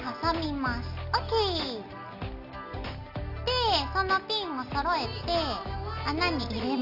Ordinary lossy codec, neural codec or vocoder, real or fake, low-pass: none; none; real; 5.4 kHz